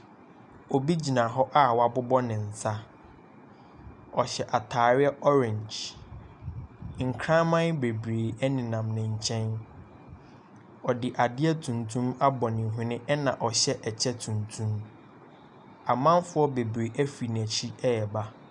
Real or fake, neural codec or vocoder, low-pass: real; none; 10.8 kHz